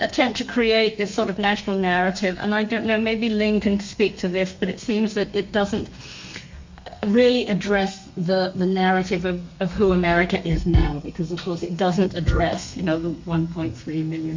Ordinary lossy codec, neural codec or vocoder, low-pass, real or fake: MP3, 48 kbps; codec, 32 kHz, 1.9 kbps, SNAC; 7.2 kHz; fake